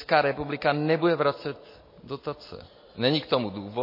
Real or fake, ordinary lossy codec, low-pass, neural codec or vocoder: real; MP3, 24 kbps; 5.4 kHz; none